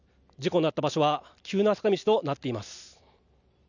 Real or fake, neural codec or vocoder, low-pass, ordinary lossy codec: real; none; 7.2 kHz; none